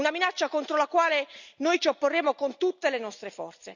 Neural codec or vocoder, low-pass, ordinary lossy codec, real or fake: none; 7.2 kHz; none; real